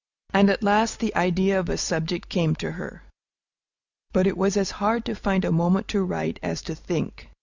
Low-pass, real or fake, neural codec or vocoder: 7.2 kHz; real; none